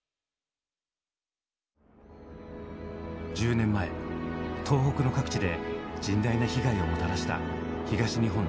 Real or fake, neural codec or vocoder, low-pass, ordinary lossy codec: real; none; none; none